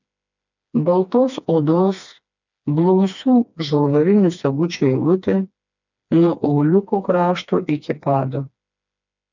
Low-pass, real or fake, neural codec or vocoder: 7.2 kHz; fake; codec, 16 kHz, 2 kbps, FreqCodec, smaller model